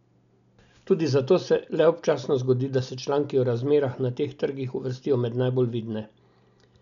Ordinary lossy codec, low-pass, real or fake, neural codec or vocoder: none; 7.2 kHz; real; none